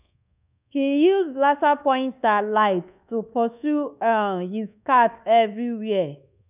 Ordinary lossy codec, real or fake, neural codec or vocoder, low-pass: none; fake; codec, 24 kHz, 1.2 kbps, DualCodec; 3.6 kHz